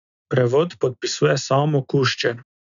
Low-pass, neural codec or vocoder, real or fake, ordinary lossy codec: 7.2 kHz; none; real; none